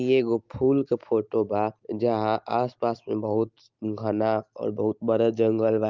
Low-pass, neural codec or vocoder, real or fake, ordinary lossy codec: 7.2 kHz; none; real; Opus, 32 kbps